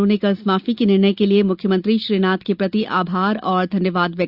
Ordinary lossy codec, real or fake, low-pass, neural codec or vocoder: Opus, 64 kbps; real; 5.4 kHz; none